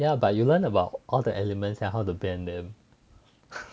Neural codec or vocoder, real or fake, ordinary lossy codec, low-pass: none; real; none; none